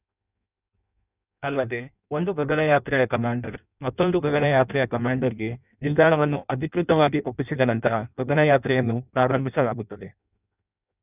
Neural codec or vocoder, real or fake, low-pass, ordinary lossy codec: codec, 16 kHz in and 24 kHz out, 0.6 kbps, FireRedTTS-2 codec; fake; 3.6 kHz; none